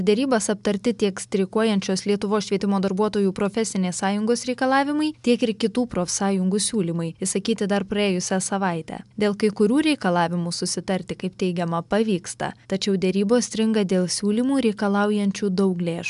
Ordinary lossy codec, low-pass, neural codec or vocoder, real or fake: MP3, 96 kbps; 10.8 kHz; none; real